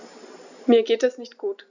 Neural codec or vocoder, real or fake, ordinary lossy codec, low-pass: none; real; none; 7.2 kHz